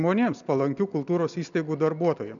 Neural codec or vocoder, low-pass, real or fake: none; 7.2 kHz; real